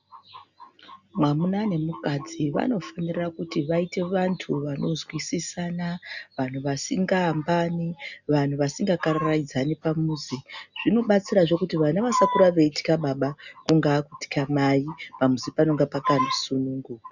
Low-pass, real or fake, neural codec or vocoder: 7.2 kHz; real; none